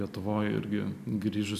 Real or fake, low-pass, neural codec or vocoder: real; 14.4 kHz; none